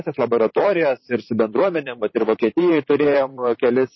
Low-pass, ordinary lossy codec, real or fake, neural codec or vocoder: 7.2 kHz; MP3, 24 kbps; fake; vocoder, 44.1 kHz, 128 mel bands, Pupu-Vocoder